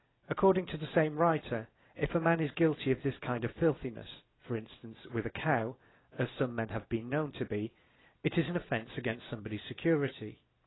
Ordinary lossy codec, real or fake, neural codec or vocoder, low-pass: AAC, 16 kbps; real; none; 7.2 kHz